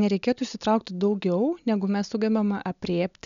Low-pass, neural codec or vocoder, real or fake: 7.2 kHz; none; real